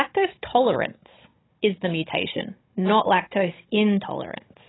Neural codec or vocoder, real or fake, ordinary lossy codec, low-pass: codec, 16 kHz, 16 kbps, FunCodec, trained on Chinese and English, 50 frames a second; fake; AAC, 16 kbps; 7.2 kHz